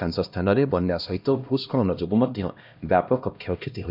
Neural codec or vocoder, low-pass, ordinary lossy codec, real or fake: codec, 16 kHz, 1 kbps, X-Codec, HuBERT features, trained on LibriSpeech; 5.4 kHz; none; fake